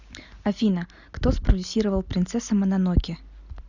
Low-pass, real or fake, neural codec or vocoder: 7.2 kHz; real; none